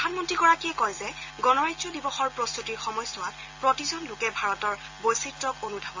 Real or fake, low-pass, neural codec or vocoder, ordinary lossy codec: real; 7.2 kHz; none; AAC, 48 kbps